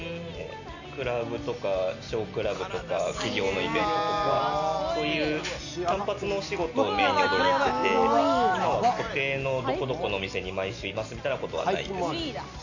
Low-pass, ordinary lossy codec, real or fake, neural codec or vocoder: 7.2 kHz; none; real; none